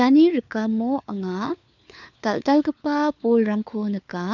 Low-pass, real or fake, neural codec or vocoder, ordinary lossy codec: 7.2 kHz; fake; codec, 16 kHz, 4 kbps, FunCodec, trained on LibriTTS, 50 frames a second; none